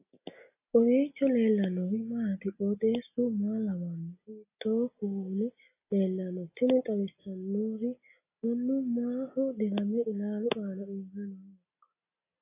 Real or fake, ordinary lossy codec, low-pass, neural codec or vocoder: real; AAC, 24 kbps; 3.6 kHz; none